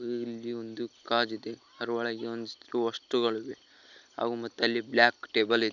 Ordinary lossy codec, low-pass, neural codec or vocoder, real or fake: none; 7.2 kHz; none; real